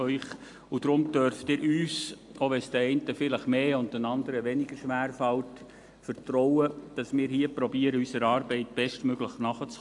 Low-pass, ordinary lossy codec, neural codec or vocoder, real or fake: 10.8 kHz; AAC, 64 kbps; none; real